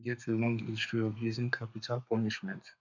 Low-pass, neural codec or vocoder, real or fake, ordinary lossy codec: 7.2 kHz; codec, 32 kHz, 1.9 kbps, SNAC; fake; none